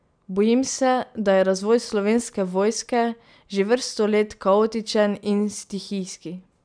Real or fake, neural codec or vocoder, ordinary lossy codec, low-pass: real; none; none; 9.9 kHz